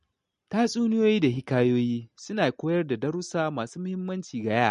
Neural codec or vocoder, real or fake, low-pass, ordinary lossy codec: none; real; 14.4 kHz; MP3, 48 kbps